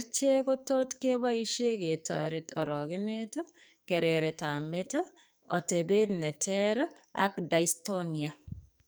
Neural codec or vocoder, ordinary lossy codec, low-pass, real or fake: codec, 44.1 kHz, 2.6 kbps, SNAC; none; none; fake